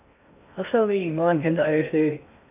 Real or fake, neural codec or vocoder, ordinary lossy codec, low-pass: fake; codec, 16 kHz in and 24 kHz out, 0.6 kbps, FocalCodec, streaming, 2048 codes; MP3, 32 kbps; 3.6 kHz